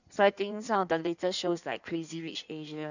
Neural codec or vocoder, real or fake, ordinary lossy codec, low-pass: codec, 16 kHz in and 24 kHz out, 1.1 kbps, FireRedTTS-2 codec; fake; none; 7.2 kHz